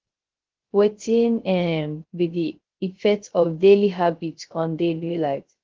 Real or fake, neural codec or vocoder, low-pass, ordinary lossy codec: fake; codec, 16 kHz, 0.3 kbps, FocalCodec; 7.2 kHz; Opus, 16 kbps